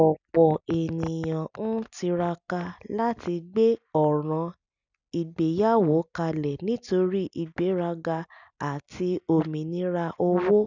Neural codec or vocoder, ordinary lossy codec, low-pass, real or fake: none; none; 7.2 kHz; real